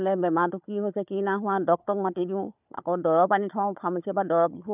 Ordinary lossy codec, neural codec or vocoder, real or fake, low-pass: none; codec, 16 kHz, 4 kbps, FunCodec, trained on Chinese and English, 50 frames a second; fake; 3.6 kHz